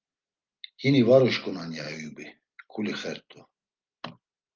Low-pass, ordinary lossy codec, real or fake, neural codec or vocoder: 7.2 kHz; Opus, 32 kbps; real; none